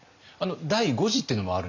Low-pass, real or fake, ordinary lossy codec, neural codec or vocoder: 7.2 kHz; real; none; none